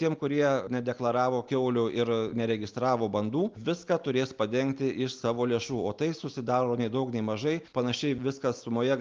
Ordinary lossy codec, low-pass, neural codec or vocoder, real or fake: Opus, 32 kbps; 7.2 kHz; none; real